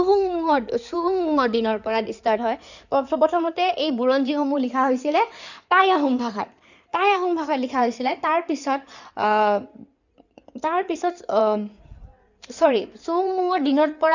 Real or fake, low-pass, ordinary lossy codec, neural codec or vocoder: fake; 7.2 kHz; none; codec, 16 kHz in and 24 kHz out, 2.2 kbps, FireRedTTS-2 codec